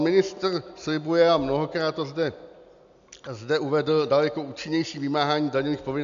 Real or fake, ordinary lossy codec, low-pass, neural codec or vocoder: real; MP3, 64 kbps; 7.2 kHz; none